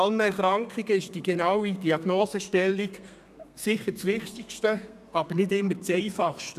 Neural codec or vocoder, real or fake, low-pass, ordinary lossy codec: codec, 32 kHz, 1.9 kbps, SNAC; fake; 14.4 kHz; none